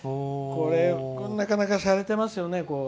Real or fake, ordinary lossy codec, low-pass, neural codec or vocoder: real; none; none; none